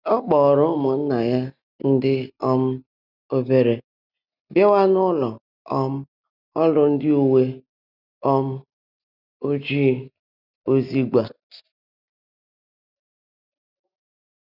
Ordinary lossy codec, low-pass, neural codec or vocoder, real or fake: none; 5.4 kHz; none; real